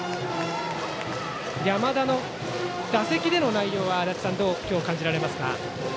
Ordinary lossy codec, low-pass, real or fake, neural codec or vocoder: none; none; real; none